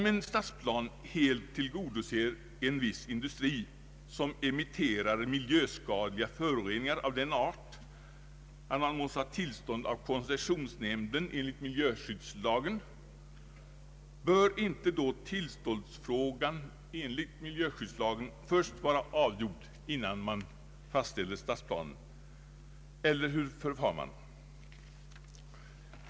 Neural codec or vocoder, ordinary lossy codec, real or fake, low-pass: none; none; real; none